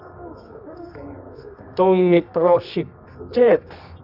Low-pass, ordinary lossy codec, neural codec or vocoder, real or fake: 5.4 kHz; none; codec, 24 kHz, 0.9 kbps, WavTokenizer, medium music audio release; fake